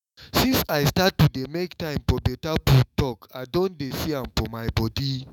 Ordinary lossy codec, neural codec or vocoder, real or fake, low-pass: none; autoencoder, 48 kHz, 128 numbers a frame, DAC-VAE, trained on Japanese speech; fake; 19.8 kHz